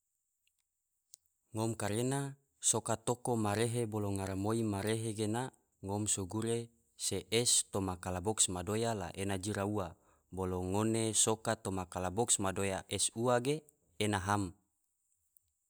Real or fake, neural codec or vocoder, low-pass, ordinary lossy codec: real; none; none; none